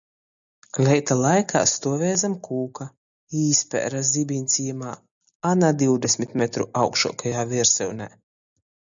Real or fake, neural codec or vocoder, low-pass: real; none; 7.2 kHz